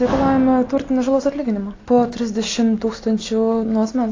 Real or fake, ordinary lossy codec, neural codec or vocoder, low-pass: real; AAC, 32 kbps; none; 7.2 kHz